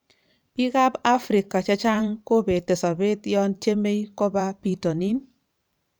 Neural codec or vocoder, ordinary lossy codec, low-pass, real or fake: vocoder, 44.1 kHz, 128 mel bands every 512 samples, BigVGAN v2; none; none; fake